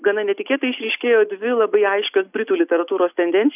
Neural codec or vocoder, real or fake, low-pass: none; real; 3.6 kHz